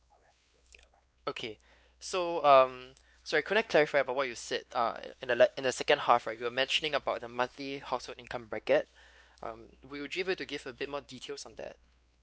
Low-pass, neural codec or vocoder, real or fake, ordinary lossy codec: none; codec, 16 kHz, 2 kbps, X-Codec, WavLM features, trained on Multilingual LibriSpeech; fake; none